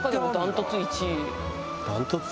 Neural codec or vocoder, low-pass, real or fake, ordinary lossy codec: none; none; real; none